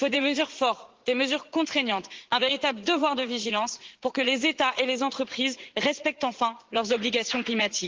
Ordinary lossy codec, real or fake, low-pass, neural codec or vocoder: Opus, 16 kbps; fake; 7.2 kHz; vocoder, 44.1 kHz, 80 mel bands, Vocos